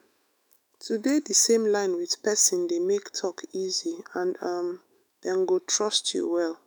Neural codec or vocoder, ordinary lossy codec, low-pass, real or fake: autoencoder, 48 kHz, 128 numbers a frame, DAC-VAE, trained on Japanese speech; none; none; fake